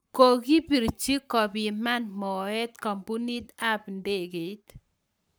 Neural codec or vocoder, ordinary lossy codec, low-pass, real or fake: codec, 44.1 kHz, 7.8 kbps, Pupu-Codec; none; none; fake